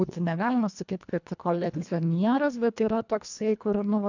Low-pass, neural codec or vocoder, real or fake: 7.2 kHz; codec, 24 kHz, 1.5 kbps, HILCodec; fake